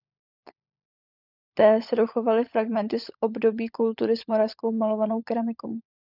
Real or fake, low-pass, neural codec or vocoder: fake; 5.4 kHz; codec, 16 kHz, 16 kbps, FunCodec, trained on LibriTTS, 50 frames a second